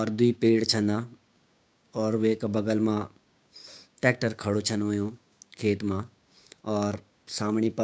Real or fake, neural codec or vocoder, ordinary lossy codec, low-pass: fake; codec, 16 kHz, 6 kbps, DAC; none; none